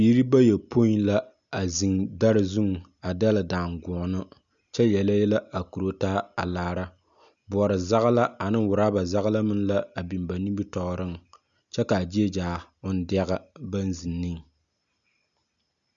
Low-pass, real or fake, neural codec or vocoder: 7.2 kHz; real; none